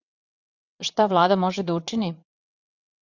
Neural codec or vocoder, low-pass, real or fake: vocoder, 22.05 kHz, 80 mel bands, WaveNeXt; 7.2 kHz; fake